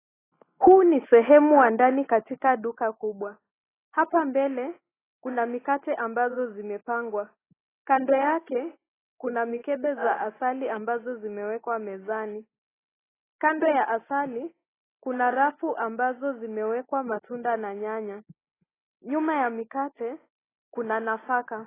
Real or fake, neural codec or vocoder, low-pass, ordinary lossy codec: real; none; 3.6 kHz; AAC, 16 kbps